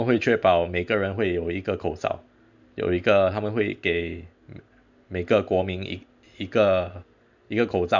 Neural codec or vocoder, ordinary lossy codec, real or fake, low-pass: none; none; real; 7.2 kHz